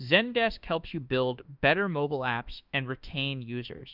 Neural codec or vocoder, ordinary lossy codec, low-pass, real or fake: autoencoder, 48 kHz, 32 numbers a frame, DAC-VAE, trained on Japanese speech; Opus, 64 kbps; 5.4 kHz; fake